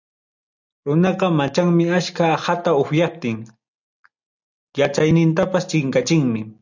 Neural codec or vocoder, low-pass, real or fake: none; 7.2 kHz; real